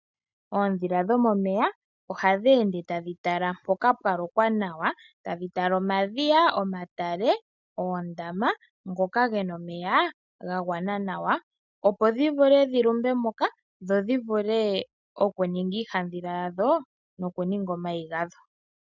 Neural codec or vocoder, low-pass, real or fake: none; 7.2 kHz; real